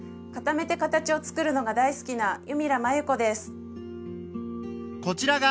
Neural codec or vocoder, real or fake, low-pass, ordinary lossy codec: none; real; none; none